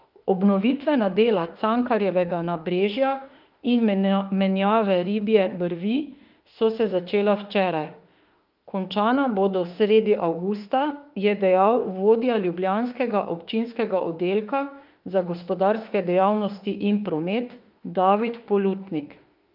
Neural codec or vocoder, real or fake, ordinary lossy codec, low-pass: autoencoder, 48 kHz, 32 numbers a frame, DAC-VAE, trained on Japanese speech; fake; Opus, 24 kbps; 5.4 kHz